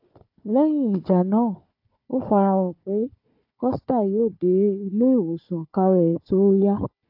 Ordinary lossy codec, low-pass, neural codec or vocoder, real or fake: AAC, 48 kbps; 5.4 kHz; codec, 16 kHz, 4 kbps, FunCodec, trained on Chinese and English, 50 frames a second; fake